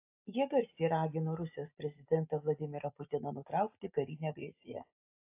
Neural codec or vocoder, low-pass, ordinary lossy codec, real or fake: none; 3.6 kHz; AAC, 32 kbps; real